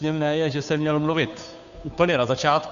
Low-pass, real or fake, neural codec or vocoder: 7.2 kHz; fake; codec, 16 kHz, 2 kbps, FunCodec, trained on Chinese and English, 25 frames a second